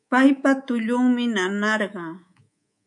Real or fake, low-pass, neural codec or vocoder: fake; 10.8 kHz; codec, 24 kHz, 3.1 kbps, DualCodec